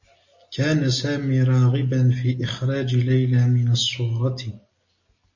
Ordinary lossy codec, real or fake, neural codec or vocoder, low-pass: MP3, 32 kbps; real; none; 7.2 kHz